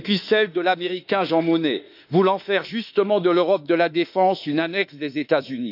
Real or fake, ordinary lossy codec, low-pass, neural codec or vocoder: fake; none; 5.4 kHz; autoencoder, 48 kHz, 32 numbers a frame, DAC-VAE, trained on Japanese speech